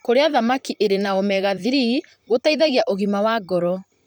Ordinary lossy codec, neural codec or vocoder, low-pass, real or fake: none; vocoder, 44.1 kHz, 128 mel bands, Pupu-Vocoder; none; fake